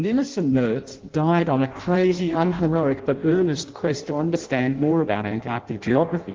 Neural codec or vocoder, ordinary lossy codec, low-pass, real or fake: codec, 16 kHz in and 24 kHz out, 0.6 kbps, FireRedTTS-2 codec; Opus, 16 kbps; 7.2 kHz; fake